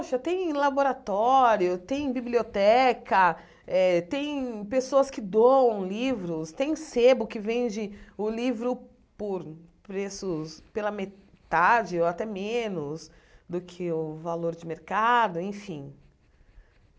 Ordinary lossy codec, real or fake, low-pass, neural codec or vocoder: none; real; none; none